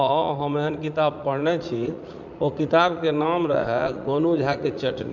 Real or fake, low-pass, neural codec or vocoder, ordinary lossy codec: fake; 7.2 kHz; vocoder, 44.1 kHz, 80 mel bands, Vocos; none